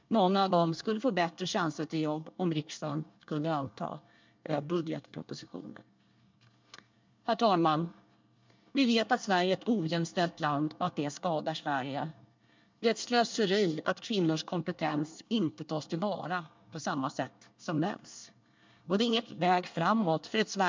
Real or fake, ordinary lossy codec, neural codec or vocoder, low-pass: fake; MP3, 64 kbps; codec, 24 kHz, 1 kbps, SNAC; 7.2 kHz